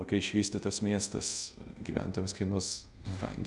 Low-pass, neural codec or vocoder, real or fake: 10.8 kHz; codec, 24 kHz, 0.5 kbps, DualCodec; fake